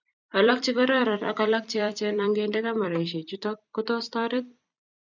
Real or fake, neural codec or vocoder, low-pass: fake; vocoder, 44.1 kHz, 128 mel bands every 256 samples, BigVGAN v2; 7.2 kHz